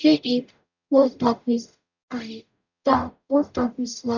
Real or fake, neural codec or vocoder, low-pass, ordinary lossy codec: fake; codec, 44.1 kHz, 0.9 kbps, DAC; 7.2 kHz; none